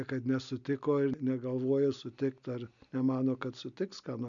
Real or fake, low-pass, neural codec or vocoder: real; 7.2 kHz; none